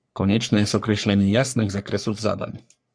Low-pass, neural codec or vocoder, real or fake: 9.9 kHz; codec, 44.1 kHz, 3.4 kbps, Pupu-Codec; fake